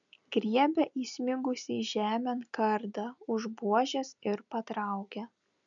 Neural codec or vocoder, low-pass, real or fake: none; 7.2 kHz; real